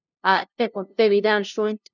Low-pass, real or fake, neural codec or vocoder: 7.2 kHz; fake; codec, 16 kHz, 0.5 kbps, FunCodec, trained on LibriTTS, 25 frames a second